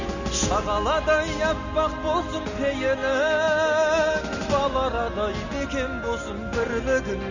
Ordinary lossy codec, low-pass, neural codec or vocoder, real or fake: none; 7.2 kHz; none; real